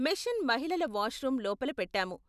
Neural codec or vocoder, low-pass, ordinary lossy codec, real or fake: none; 14.4 kHz; none; real